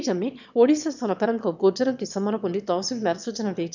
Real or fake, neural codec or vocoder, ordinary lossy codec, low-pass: fake; autoencoder, 22.05 kHz, a latent of 192 numbers a frame, VITS, trained on one speaker; none; 7.2 kHz